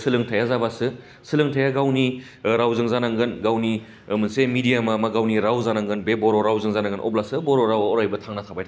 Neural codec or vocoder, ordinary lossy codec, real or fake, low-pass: none; none; real; none